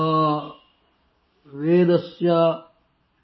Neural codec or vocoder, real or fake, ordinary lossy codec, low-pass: none; real; MP3, 24 kbps; 7.2 kHz